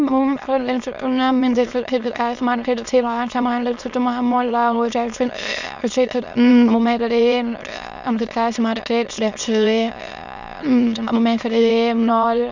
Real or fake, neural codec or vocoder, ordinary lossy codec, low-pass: fake; autoencoder, 22.05 kHz, a latent of 192 numbers a frame, VITS, trained on many speakers; Opus, 64 kbps; 7.2 kHz